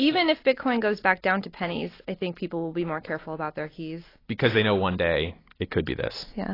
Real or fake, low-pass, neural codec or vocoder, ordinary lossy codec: real; 5.4 kHz; none; AAC, 24 kbps